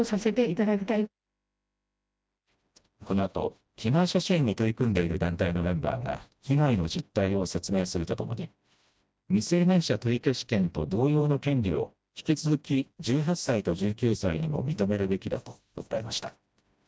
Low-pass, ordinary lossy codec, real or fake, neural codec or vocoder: none; none; fake; codec, 16 kHz, 1 kbps, FreqCodec, smaller model